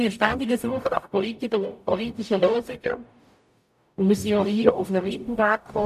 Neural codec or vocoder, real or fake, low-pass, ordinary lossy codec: codec, 44.1 kHz, 0.9 kbps, DAC; fake; 14.4 kHz; none